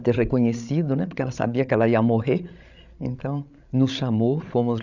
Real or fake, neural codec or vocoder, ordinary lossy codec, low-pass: fake; codec, 16 kHz, 8 kbps, FreqCodec, larger model; none; 7.2 kHz